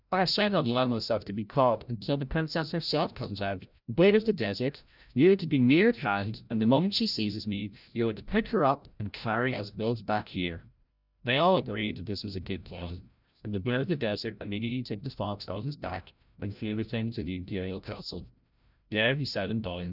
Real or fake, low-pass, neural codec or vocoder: fake; 5.4 kHz; codec, 16 kHz, 0.5 kbps, FreqCodec, larger model